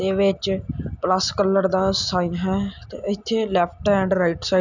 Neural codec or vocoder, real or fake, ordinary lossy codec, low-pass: none; real; none; 7.2 kHz